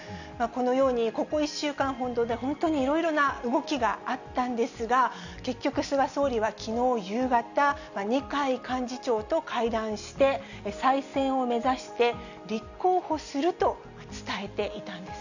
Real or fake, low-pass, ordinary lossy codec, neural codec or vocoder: real; 7.2 kHz; none; none